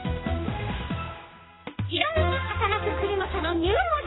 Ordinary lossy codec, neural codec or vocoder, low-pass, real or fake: AAC, 16 kbps; codec, 16 kHz, 1 kbps, X-Codec, HuBERT features, trained on general audio; 7.2 kHz; fake